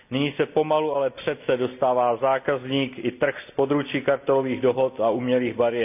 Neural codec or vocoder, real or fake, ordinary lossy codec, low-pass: none; real; none; 3.6 kHz